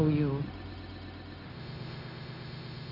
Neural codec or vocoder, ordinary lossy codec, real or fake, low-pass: codec, 16 kHz, 0.4 kbps, LongCat-Audio-Codec; Opus, 32 kbps; fake; 5.4 kHz